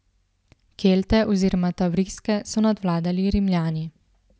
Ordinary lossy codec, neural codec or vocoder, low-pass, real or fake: none; none; none; real